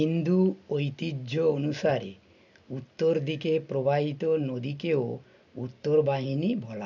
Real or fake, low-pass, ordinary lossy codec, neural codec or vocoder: real; 7.2 kHz; none; none